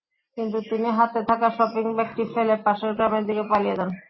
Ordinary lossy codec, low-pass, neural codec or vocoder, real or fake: MP3, 24 kbps; 7.2 kHz; none; real